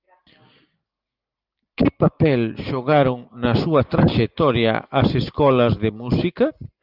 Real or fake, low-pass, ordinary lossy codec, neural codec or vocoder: real; 5.4 kHz; Opus, 32 kbps; none